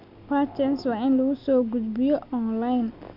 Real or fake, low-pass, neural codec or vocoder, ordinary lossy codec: real; 5.4 kHz; none; none